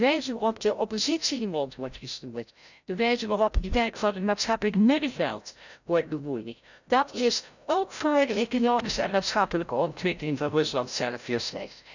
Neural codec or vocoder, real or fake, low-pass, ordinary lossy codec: codec, 16 kHz, 0.5 kbps, FreqCodec, larger model; fake; 7.2 kHz; none